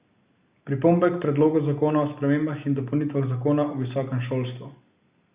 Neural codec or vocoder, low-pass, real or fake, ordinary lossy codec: none; 3.6 kHz; real; Opus, 64 kbps